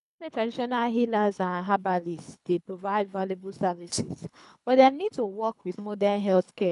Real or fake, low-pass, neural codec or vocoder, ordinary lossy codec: fake; 10.8 kHz; codec, 24 kHz, 3 kbps, HILCodec; none